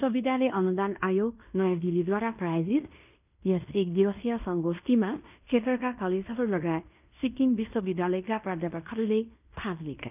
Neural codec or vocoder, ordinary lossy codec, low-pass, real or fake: codec, 16 kHz in and 24 kHz out, 0.9 kbps, LongCat-Audio-Codec, fine tuned four codebook decoder; none; 3.6 kHz; fake